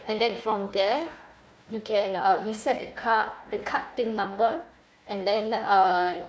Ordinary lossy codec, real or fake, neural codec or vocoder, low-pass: none; fake; codec, 16 kHz, 1 kbps, FunCodec, trained on Chinese and English, 50 frames a second; none